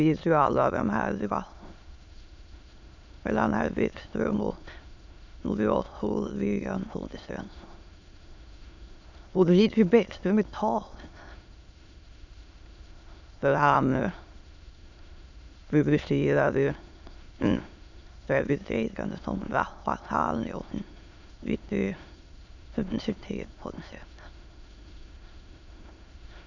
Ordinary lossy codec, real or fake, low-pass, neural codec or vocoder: none; fake; 7.2 kHz; autoencoder, 22.05 kHz, a latent of 192 numbers a frame, VITS, trained on many speakers